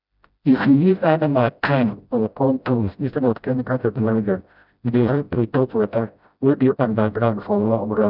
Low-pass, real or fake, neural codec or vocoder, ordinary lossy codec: 5.4 kHz; fake; codec, 16 kHz, 0.5 kbps, FreqCodec, smaller model; none